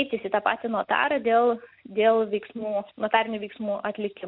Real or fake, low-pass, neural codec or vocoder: real; 5.4 kHz; none